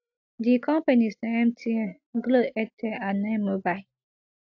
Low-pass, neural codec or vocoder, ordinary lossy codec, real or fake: 7.2 kHz; none; AAC, 48 kbps; real